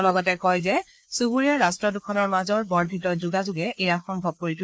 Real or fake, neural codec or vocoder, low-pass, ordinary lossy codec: fake; codec, 16 kHz, 2 kbps, FreqCodec, larger model; none; none